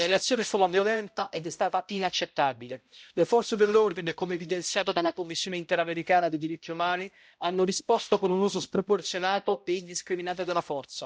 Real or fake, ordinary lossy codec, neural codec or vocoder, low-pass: fake; none; codec, 16 kHz, 0.5 kbps, X-Codec, HuBERT features, trained on balanced general audio; none